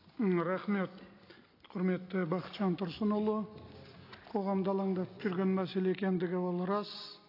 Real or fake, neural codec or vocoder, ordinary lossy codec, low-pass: real; none; AAC, 32 kbps; 5.4 kHz